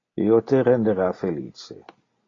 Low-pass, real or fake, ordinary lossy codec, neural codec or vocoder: 7.2 kHz; real; AAC, 32 kbps; none